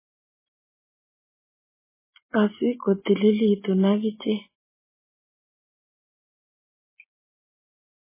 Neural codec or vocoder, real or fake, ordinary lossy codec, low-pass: none; real; MP3, 16 kbps; 3.6 kHz